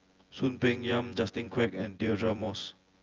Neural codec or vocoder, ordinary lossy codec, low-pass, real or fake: vocoder, 24 kHz, 100 mel bands, Vocos; Opus, 24 kbps; 7.2 kHz; fake